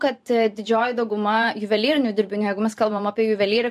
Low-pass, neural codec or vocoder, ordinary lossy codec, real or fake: 14.4 kHz; vocoder, 44.1 kHz, 128 mel bands every 512 samples, BigVGAN v2; MP3, 64 kbps; fake